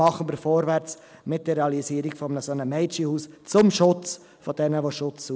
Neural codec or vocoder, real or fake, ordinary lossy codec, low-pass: none; real; none; none